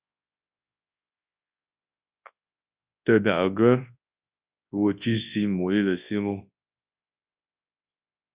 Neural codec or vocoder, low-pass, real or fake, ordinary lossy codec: codec, 24 kHz, 0.9 kbps, WavTokenizer, large speech release; 3.6 kHz; fake; Opus, 64 kbps